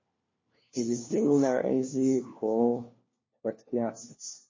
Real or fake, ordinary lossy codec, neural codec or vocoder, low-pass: fake; MP3, 32 kbps; codec, 16 kHz, 1 kbps, FunCodec, trained on LibriTTS, 50 frames a second; 7.2 kHz